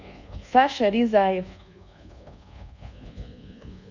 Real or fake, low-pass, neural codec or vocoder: fake; 7.2 kHz; codec, 24 kHz, 1.2 kbps, DualCodec